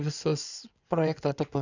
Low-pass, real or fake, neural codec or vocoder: 7.2 kHz; fake; codec, 16 kHz in and 24 kHz out, 2.2 kbps, FireRedTTS-2 codec